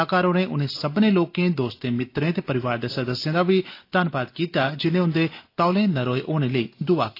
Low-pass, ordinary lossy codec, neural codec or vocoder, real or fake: 5.4 kHz; AAC, 32 kbps; none; real